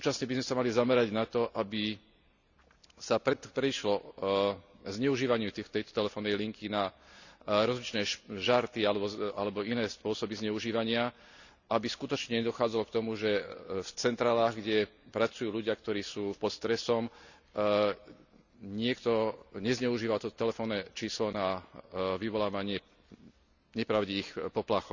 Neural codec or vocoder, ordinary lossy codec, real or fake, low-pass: none; none; real; 7.2 kHz